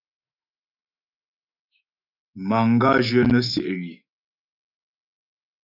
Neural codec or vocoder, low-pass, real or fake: autoencoder, 48 kHz, 128 numbers a frame, DAC-VAE, trained on Japanese speech; 5.4 kHz; fake